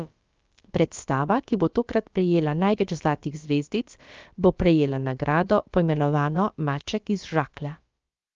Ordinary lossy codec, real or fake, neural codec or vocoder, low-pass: Opus, 24 kbps; fake; codec, 16 kHz, about 1 kbps, DyCAST, with the encoder's durations; 7.2 kHz